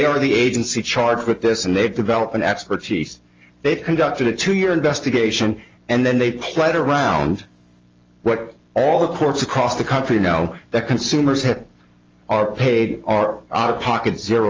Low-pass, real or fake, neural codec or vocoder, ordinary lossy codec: 7.2 kHz; real; none; Opus, 32 kbps